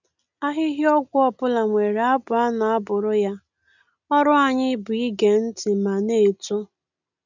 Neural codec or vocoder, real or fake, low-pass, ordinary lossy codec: none; real; 7.2 kHz; none